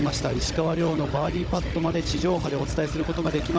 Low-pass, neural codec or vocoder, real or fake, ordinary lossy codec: none; codec, 16 kHz, 16 kbps, FunCodec, trained on Chinese and English, 50 frames a second; fake; none